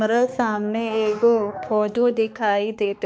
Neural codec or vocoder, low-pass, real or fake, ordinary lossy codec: codec, 16 kHz, 2 kbps, X-Codec, HuBERT features, trained on balanced general audio; none; fake; none